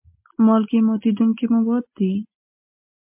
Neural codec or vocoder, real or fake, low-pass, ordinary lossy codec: none; real; 3.6 kHz; MP3, 32 kbps